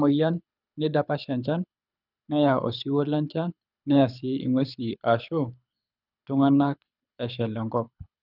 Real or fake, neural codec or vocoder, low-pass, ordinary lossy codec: fake; codec, 24 kHz, 6 kbps, HILCodec; 5.4 kHz; none